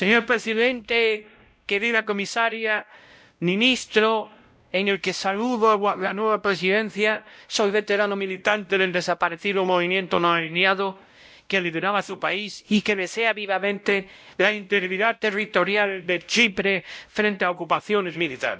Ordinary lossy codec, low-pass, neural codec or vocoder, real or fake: none; none; codec, 16 kHz, 0.5 kbps, X-Codec, WavLM features, trained on Multilingual LibriSpeech; fake